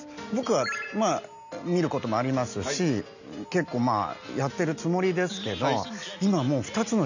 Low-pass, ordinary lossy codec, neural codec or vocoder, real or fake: 7.2 kHz; none; none; real